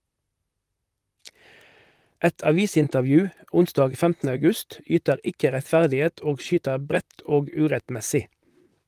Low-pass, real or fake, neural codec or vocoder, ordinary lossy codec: 14.4 kHz; fake; vocoder, 44.1 kHz, 128 mel bands, Pupu-Vocoder; Opus, 32 kbps